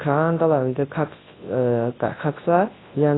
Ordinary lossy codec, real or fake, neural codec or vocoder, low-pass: AAC, 16 kbps; fake; codec, 24 kHz, 0.9 kbps, WavTokenizer, large speech release; 7.2 kHz